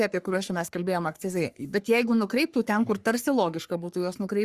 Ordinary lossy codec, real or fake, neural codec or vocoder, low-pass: Opus, 64 kbps; fake; codec, 44.1 kHz, 3.4 kbps, Pupu-Codec; 14.4 kHz